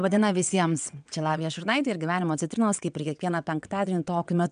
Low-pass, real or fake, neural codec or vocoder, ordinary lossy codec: 9.9 kHz; fake; vocoder, 22.05 kHz, 80 mel bands, WaveNeXt; MP3, 96 kbps